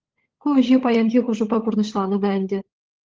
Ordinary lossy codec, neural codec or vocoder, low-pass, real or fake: Opus, 16 kbps; codec, 16 kHz, 16 kbps, FunCodec, trained on LibriTTS, 50 frames a second; 7.2 kHz; fake